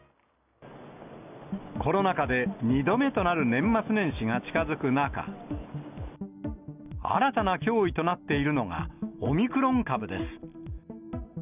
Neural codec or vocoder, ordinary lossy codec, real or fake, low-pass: none; none; real; 3.6 kHz